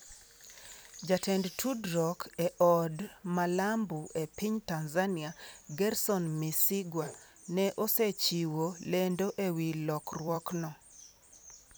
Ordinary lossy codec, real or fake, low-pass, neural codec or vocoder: none; fake; none; vocoder, 44.1 kHz, 128 mel bands every 256 samples, BigVGAN v2